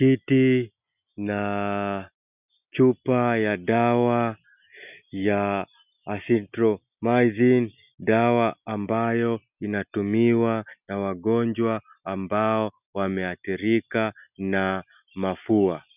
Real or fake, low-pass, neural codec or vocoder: real; 3.6 kHz; none